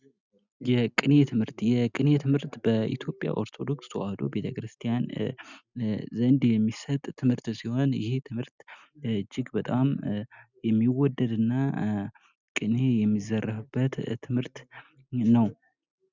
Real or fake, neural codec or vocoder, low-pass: real; none; 7.2 kHz